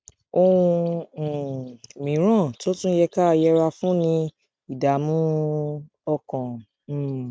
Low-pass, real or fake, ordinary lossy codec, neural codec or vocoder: none; real; none; none